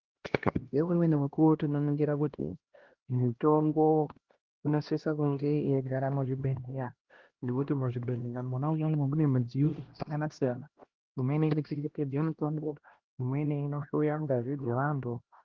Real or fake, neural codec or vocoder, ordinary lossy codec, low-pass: fake; codec, 16 kHz, 1 kbps, X-Codec, HuBERT features, trained on LibriSpeech; Opus, 16 kbps; 7.2 kHz